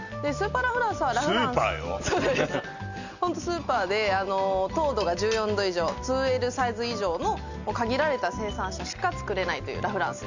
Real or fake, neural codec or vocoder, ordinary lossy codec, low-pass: real; none; none; 7.2 kHz